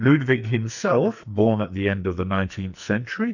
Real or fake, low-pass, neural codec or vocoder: fake; 7.2 kHz; codec, 44.1 kHz, 2.6 kbps, SNAC